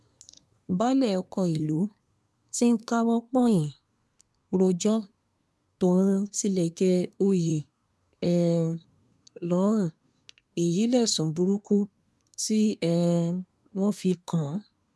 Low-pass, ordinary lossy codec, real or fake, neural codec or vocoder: none; none; fake; codec, 24 kHz, 1 kbps, SNAC